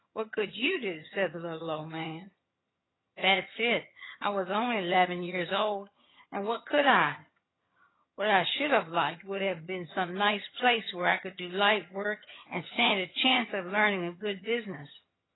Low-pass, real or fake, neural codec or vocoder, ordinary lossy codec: 7.2 kHz; fake; vocoder, 22.05 kHz, 80 mel bands, HiFi-GAN; AAC, 16 kbps